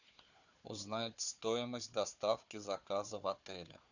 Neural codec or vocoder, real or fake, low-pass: codec, 16 kHz, 4 kbps, FunCodec, trained on Chinese and English, 50 frames a second; fake; 7.2 kHz